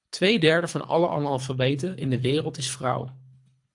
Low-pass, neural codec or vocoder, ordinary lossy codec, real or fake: 10.8 kHz; codec, 24 kHz, 3 kbps, HILCodec; AAC, 64 kbps; fake